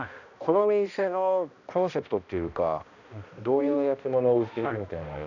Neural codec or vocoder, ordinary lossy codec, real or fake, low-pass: codec, 16 kHz, 1 kbps, X-Codec, HuBERT features, trained on balanced general audio; none; fake; 7.2 kHz